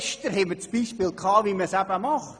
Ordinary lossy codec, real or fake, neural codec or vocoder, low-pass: MP3, 96 kbps; real; none; 9.9 kHz